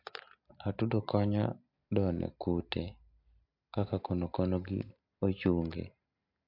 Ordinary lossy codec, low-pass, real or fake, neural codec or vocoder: MP3, 48 kbps; 5.4 kHz; real; none